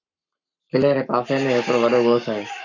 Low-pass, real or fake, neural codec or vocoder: 7.2 kHz; fake; codec, 44.1 kHz, 7.8 kbps, Pupu-Codec